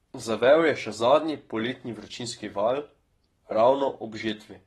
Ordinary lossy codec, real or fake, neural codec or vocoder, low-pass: AAC, 32 kbps; fake; codec, 44.1 kHz, 7.8 kbps, Pupu-Codec; 19.8 kHz